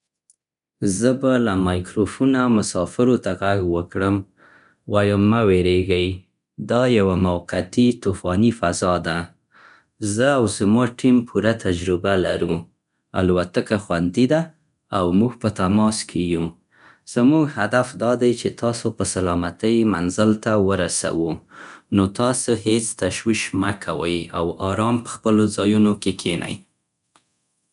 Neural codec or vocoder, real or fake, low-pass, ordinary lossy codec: codec, 24 kHz, 0.9 kbps, DualCodec; fake; 10.8 kHz; none